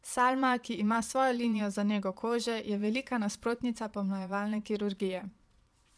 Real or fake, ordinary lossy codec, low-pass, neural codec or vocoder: fake; none; none; vocoder, 22.05 kHz, 80 mel bands, Vocos